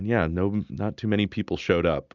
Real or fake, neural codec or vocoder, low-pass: real; none; 7.2 kHz